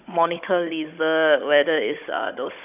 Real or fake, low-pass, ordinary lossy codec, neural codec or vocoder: fake; 3.6 kHz; none; codec, 16 kHz, 16 kbps, FunCodec, trained on Chinese and English, 50 frames a second